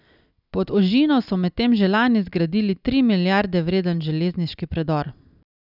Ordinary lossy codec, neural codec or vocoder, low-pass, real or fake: none; none; 5.4 kHz; real